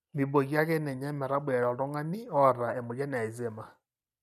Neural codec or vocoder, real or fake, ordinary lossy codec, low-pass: none; real; none; 14.4 kHz